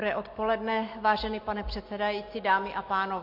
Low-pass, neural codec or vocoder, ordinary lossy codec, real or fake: 5.4 kHz; none; MP3, 32 kbps; real